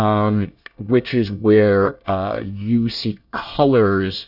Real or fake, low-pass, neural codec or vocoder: fake; 5.4 kHz; codec, 24 kHz, 1 kbps, SNAC